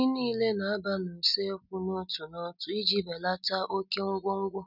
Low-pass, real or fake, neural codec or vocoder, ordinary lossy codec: 5.4 kHz; real; none; none